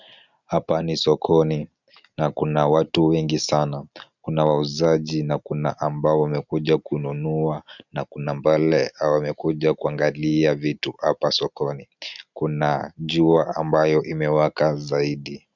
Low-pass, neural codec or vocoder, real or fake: 7.2 kHz; none; real